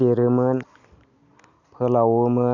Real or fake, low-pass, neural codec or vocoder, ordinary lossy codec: real; 7.2 kHz; none; none